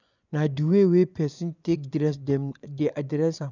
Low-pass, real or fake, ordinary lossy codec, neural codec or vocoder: 7.2 kHz; fake; none; vocoder, 24 kHz, 100 mel bands, Vocos